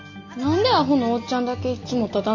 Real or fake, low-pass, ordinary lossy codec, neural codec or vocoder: real; 7.2 kHz; none; none